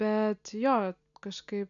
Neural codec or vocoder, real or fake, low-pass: none; real; 7.2 kHz